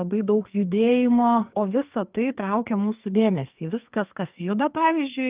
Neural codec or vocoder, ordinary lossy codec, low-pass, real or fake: codec, 44.1 kHz, 2.6 kbps, SNAC; Opus, 32 kbps; 3.6 kHz; fake